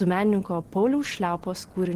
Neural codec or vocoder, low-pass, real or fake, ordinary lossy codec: none; 14.4 kHz; real; Opus, 16 kbps